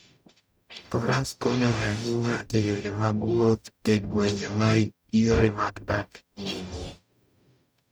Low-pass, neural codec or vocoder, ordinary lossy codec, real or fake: none; codec, 44.1 kHz, 0.9 kbps, DAC; none; fake